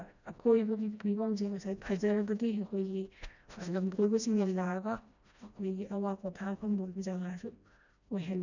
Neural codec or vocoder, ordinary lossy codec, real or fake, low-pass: codec, 16 kHz, 1 kbps, FreqCodec, smaller model; none; fake; 7.2 kHz